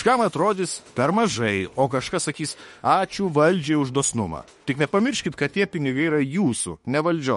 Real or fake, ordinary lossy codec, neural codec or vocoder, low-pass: fake; MP3, 48 kbps; autoencoder, 48 kHz, 32 numbers a frame, DAC-VAE, trained on Japanese speech; 19.8 kHz